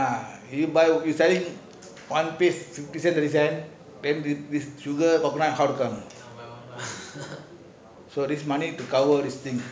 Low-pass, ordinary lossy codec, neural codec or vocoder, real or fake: none; none; none; real